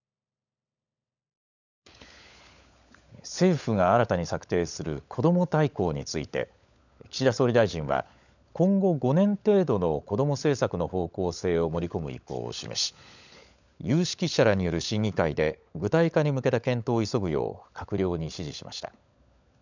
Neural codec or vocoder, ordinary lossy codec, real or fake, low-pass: codec, 16 kHz, 16 kbps, FunCodec, trained on LibriTTS, 50 frames a second; none; fake; 7.2 kHz